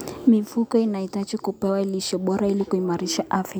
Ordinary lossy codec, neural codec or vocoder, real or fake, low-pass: none; none; real; none